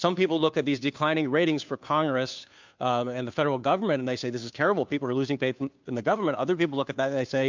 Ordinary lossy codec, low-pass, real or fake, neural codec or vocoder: MP3, 64 kbps; 7.2 kHz; fake; codec, 16 kHz, 2 kbps, FunCodec, trained on Chinese and English, 25 frames a second